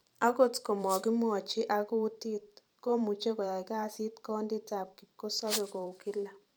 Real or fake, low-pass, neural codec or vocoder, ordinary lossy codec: fake; none; vocoder, 44.1 kHz, 128 mel bands every 512 samples, BigVGAN v2; none